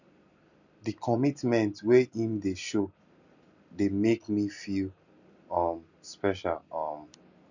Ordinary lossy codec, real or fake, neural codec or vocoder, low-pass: none; real; none; 7.2 kHz